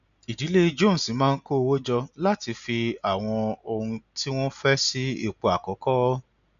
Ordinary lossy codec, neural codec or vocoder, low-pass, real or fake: none; none; 7.2 kHz; real